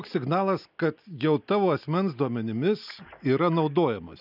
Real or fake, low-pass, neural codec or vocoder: real; 5.4 kHz; none